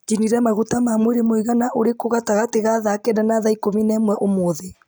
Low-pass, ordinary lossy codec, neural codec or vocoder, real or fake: none; none; none; real